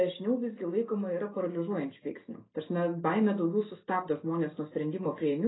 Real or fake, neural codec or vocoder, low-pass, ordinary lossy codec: real; none; 7.2 kHz; AAC, 16 kbps